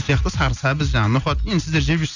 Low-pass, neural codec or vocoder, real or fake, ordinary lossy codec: 7.2 kHz; none; real; none